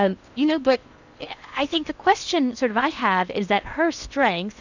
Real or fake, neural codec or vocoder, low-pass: fake; codec, 16 kHz in and 24 kHz out, 0.8 kbps, FocalCodec, streaming, 65536 codes; 7.2 kHz